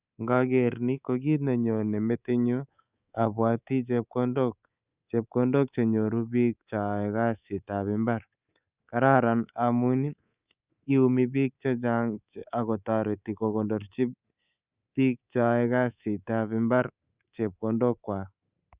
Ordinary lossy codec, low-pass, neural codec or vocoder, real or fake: Opus, 64 kbps; 3.6 kHz; codec, 24 kHz, 3.1 kbps, DualCodec; fake